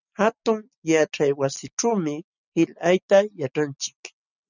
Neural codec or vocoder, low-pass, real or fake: none; 7.2 kHz; real